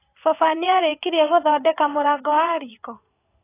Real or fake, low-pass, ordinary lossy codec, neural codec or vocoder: fake; 3.6 kHz; AAC, 16 kbps; vocoder, 22.05 kHz, 80 mel bands, WaveNeXt